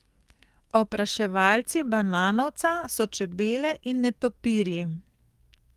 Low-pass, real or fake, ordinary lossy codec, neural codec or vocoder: 14.4 kHz; fake; Opus, 32 kbps; codec, 44.1 kHz, 2.6 kbps, SNAC